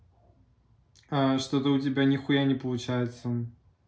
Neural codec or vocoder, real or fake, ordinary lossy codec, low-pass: none; real; none; none